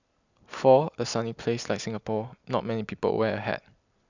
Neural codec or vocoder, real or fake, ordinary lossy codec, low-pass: none; real; none; 7.2 kHz